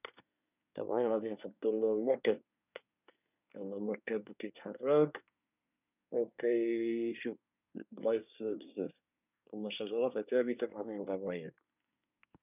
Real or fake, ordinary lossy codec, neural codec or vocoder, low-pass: fake; none; codec, 24 kHz, 1 kbps, SNAC; 3.6 kHz